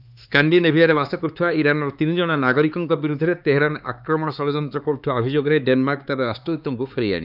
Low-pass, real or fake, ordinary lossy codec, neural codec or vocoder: 5.4 kHz; fake; none; codec, 16 kHz, 4 kbps, X-Codec, HuBERT features, trained on LibriSpeech